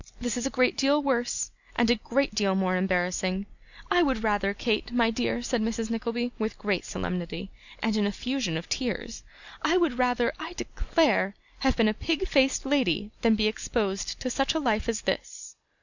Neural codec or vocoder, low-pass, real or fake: none; 7.2 kHz; real